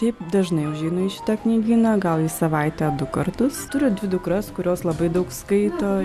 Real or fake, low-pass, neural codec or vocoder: real; 14.4 kHz; none